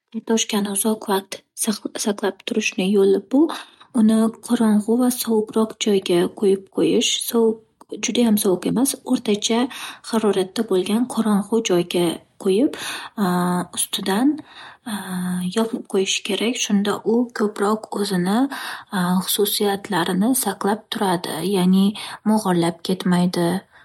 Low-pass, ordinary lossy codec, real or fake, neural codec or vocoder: 19.8 kHz; MP3, 64 kbps; real; none